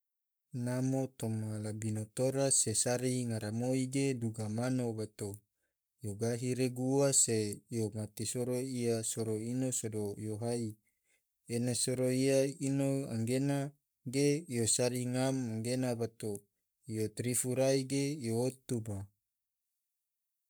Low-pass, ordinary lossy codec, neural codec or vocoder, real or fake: none; none; codec, 44.1 kHz, 7.8 kbps, Pupu-Codec; fake